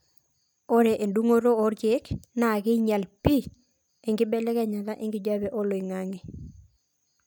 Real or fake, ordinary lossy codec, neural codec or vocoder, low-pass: real; none; none; none